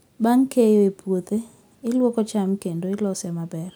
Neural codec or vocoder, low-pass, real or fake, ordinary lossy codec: none; none; real; none